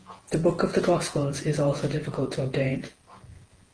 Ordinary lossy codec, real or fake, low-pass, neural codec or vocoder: Opus, 16 kbps; fake; 9.9 kHz; vocoder, 48 kHz, 128 mel bands, Vocos